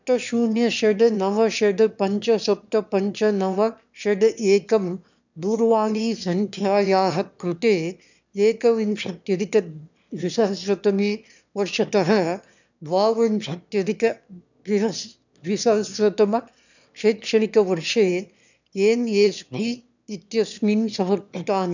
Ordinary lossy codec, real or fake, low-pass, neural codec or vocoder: none; fake; 7.2 kHz; autoencoder, 22.05 kHz, a latent of 192 numbers a frame, VITS, trained on one speaker